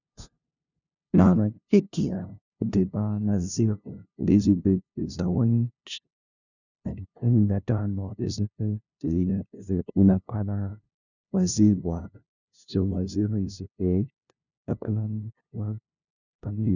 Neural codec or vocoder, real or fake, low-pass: codec, 16 kHz, 0.5 kbps, FunCodec, trained on LibriTTS, 25 frames a second; fake; 7.2 kHz